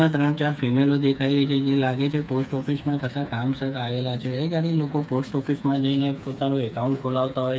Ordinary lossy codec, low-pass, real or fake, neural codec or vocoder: none; none; fake; codec, 16 kHz, 4 kbps, FreqCodec, smaller model